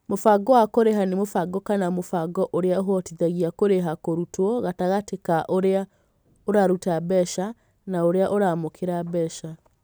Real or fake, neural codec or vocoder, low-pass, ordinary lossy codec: real; none; none; none